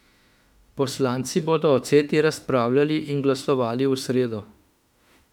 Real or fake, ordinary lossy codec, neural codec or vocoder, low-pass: fake; none; autoencoder, 48 kHz, 32 numbers a frame, DAC-VAE, trained on Japanese speech; 19.8 kHz